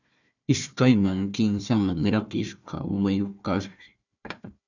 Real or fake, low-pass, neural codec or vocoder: fake; 7.2 kHz; codec, 16 kHz, 1 kbps, FunCodec, trained on Chinese and English, 50 frames a second